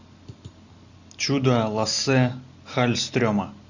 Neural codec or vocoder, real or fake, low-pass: none; real; 7.2 kHz